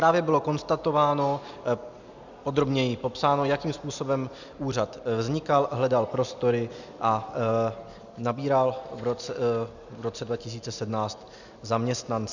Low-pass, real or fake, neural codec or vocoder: 7.2 kHz; real; none